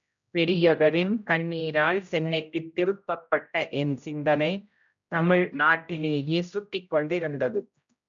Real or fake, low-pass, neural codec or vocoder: fake; 7.2 kHz; codec, 16 kHz, 0.5 kbps, X-Codec, HuBERT features, trained on general audio